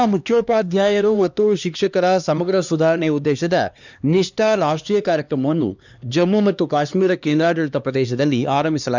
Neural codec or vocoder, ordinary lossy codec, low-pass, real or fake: codec, 16 kHz, 2 kbps, X-Codec, HuBERT features, trained on LibriSpeech; none; 7.2 kHz; fake